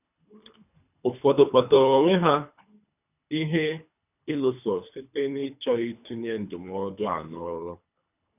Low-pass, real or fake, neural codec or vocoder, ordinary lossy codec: 3.6 kHz; fake; codec, 24 kHz, 3 kbps, HILCodec; none